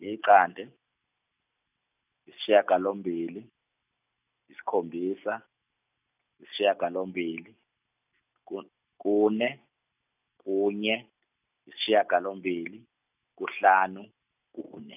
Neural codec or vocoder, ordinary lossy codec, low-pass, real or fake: none; none; 3.6 kHz; real